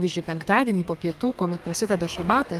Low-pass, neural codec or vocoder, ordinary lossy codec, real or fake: 14.4 kHz; codec, 32 kHz, 1.9 kbps, SNAC; Opus, 32 kbps; fake